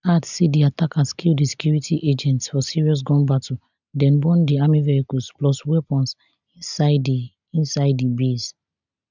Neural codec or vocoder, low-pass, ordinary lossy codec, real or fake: none; 7.2 kHz; none; real